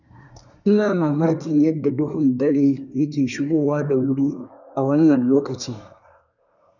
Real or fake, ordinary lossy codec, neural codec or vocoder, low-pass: fake; none; codec, 24 kHz, 1 kbps, SNAC; 7.2 kHz